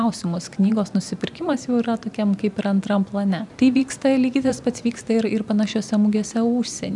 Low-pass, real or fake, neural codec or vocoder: 10.8 kHz; fake; vocoder, 44.1 kHz, 128 mel bands every 512 samples, BigVGAN v2